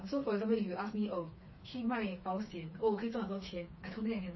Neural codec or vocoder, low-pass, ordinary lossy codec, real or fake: codec, 16 kHz, 4 kbps, FreqCodec, smaller model; 7.2 kHz; MP3, 24 kbps; fake